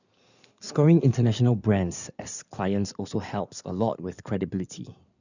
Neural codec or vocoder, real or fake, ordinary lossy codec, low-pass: codec, 16 kHz in and 24 kHz out, 2.2 kbps, FireRedTTS-2 codec; fake; none; 7.2 kHz